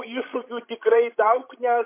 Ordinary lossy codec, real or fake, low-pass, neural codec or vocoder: MP3, 24 kbps; fake; 3.6 kHz; codec, 16 kHz, 16 kbps, FreqCodec, larger model